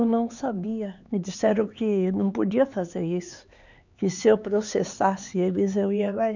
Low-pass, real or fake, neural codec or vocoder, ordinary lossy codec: 7.2 kHz; fake; codec, 16 kHz, 4 kbps, X-Codec, HuBERT features, trained on LibriSpeech; none